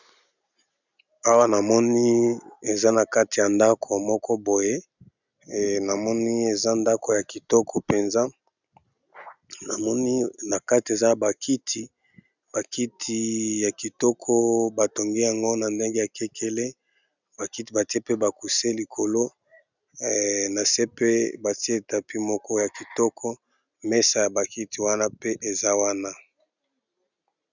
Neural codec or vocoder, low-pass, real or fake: none; 7.2 kHz; real